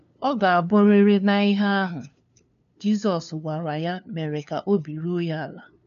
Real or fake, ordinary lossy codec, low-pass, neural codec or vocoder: fake; none; 7.2 kHz; codec, 16 kHz, 2 kbps, FunCodec, trained on LibriTTS, 25 frames a second